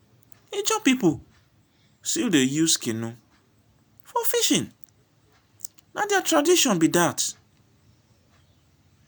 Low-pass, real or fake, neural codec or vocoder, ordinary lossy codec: none; real; none; none